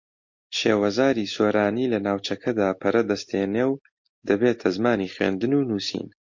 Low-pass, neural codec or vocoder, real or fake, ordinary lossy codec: 7.2 kHz; none; real; MP3, 48 kbps